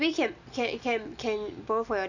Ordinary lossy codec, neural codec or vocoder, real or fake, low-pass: none; none; real; 7.2 kHz